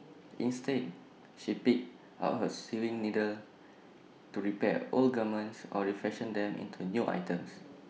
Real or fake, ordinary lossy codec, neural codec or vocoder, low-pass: real; none; none; none